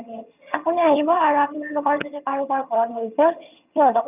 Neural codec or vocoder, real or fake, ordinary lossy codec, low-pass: vocoder, 22.05 kHz, 80 mel bands, HiFi-GAN; fake; none; 3.6 kHz